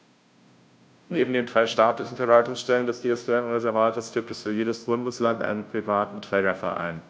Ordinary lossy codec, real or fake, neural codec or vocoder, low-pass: none; fake; codec, 16 kHz, 0.5 kbps, FunCodec, trained on Chinese and English, 25 frames a second; none